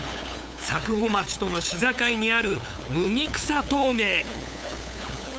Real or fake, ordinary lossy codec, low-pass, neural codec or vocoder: fake; none; none; codec, 16 kHz, 8 kbps, FunCodec, trained on LibriTTS, 25 frames a second